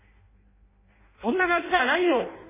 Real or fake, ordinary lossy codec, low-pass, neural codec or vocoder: fake; MP3, 16 kbps; 3.6 kHz; codec, 16 kHz in and 24 kHz out, 0.6 kbps, FireRedTTS-2 codec